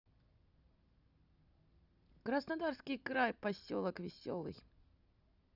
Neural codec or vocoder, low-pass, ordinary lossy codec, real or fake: none; 5.4 kHz; Opus, 64 kbps; real